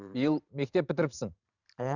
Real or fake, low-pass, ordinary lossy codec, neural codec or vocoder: fake; 7.2 kHz; none; vocoder, 44.1 kHz, 128 mel bands every 512 samples, BigVGAN v2